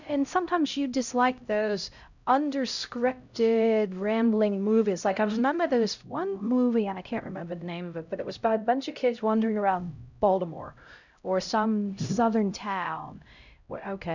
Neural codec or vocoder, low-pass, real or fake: codec, 16 kHz, 0.5 kbps, X-Codec, HuBERT features, trained on LibriSpeech; 7.2 kHz; fake